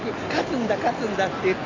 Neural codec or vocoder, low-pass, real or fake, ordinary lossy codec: none; 7.2 kHz; real; AAC, 32 kbps